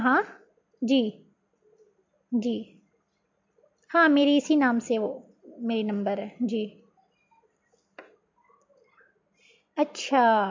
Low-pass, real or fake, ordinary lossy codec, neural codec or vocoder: 7.2 kHz; real; MP3, 48 kbps; none